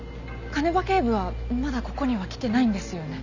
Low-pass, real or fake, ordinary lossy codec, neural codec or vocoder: 7.2 kHz; real; none; none